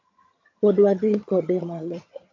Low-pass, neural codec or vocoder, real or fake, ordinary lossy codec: 7.2 kHz; vocoder, 22.05 kHz, 80 mel bands, HiFi-GAN; fake; AAC, 48 kbps